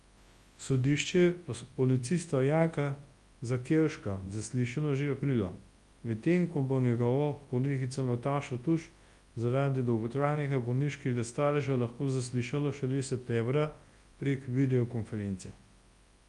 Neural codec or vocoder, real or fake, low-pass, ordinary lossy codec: codec, 24 kHz, 0.9 kbps, WavTokenizer, large speech release; fake; 10.8 kHz; Opus, 32 kbps